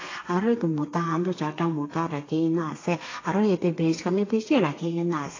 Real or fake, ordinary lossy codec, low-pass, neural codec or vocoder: fake; AAC, 32 kbps; 7.2 kHz; codec, 32 kHz, 1.9 kbps, SNAC